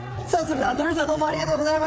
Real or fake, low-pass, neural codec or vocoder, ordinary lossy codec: fake; none; codec, 16 kHz, 4 kbps, FreqCodec, larger model; none